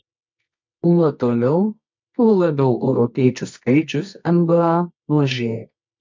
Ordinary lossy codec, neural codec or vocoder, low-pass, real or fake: MP3, 48 kbps; codec, 24 kHz, 0.9 kbps, WavTokenizer, medium music audio release; 7.2 kHz; fake